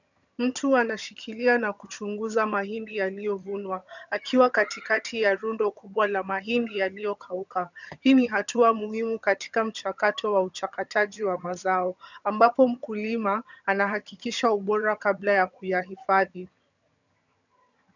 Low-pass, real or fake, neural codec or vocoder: 7.2 kHz; fake; vocoder, 22.05 kHz, 80 mel bands, HiFi-GAN